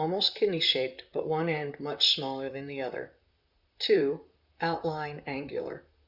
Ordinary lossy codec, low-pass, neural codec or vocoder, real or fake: Opus, 64 kbps; 5.4 kHz; vocoder, 44.1 kHz, 128 mel bands, Pupu-Vocoder; fake